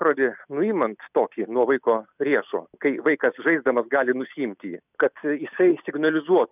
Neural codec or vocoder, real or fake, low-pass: none; real; 3.6 kHz